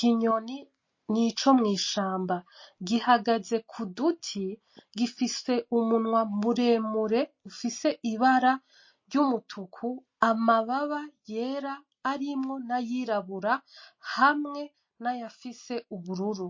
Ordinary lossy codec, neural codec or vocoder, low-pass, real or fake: MP3, 32 kbps; none; 7.2 kHz; real